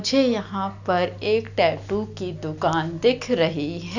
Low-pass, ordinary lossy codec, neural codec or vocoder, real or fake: 7.2 kHz; none; none; real